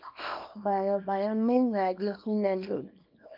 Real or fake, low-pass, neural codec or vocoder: fake; 5.4 kHz; codec, 24 kHz, 0.9 kbps, WavTokenizer, small release